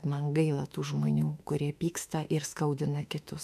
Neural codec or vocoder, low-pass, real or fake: autoencoder, 48 kHz, 32 numbers a frame, DAC-VAE, trained on Japanese speech; 14.4 kHz; fake